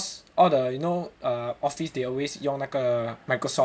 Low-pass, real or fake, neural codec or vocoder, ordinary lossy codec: none; real; none; none